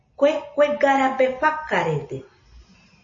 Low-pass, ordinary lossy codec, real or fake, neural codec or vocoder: 7.2 kHz; MP3, 32 kbps; real; none